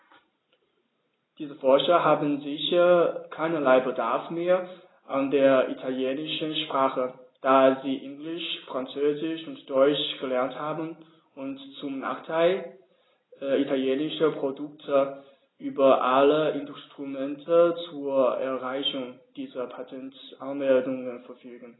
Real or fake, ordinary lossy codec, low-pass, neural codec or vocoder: real; AAC, 16 kbps; 7.2 kHz; none